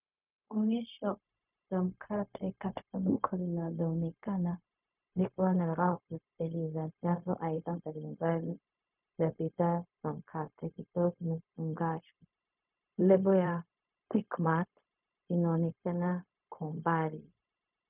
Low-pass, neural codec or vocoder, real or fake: 3.6 kHz; codec, 16 kHz, 0.4 kbps, LongCat-Audio-Codec; fake